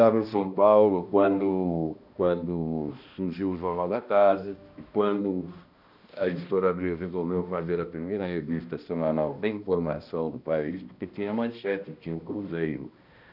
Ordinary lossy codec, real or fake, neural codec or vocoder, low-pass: none; fake; codec, 16 kHz, 1 kbps, X-Codec, HuBERT features, trained on balanced general audio; 5.4 kHz